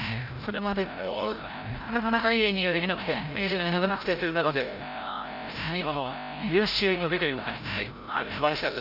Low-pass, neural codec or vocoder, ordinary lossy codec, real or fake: 5.4 kHz; codec, 16 kHz, 0.5 kbps, FreqCodec, larger model; none; fake